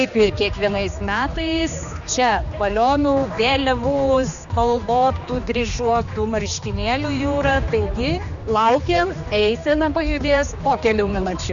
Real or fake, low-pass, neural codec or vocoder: fake; 7.2 kHz; codec, 16 kHz, 2 kbps, X-Codec, HuBERT features, trained on general audio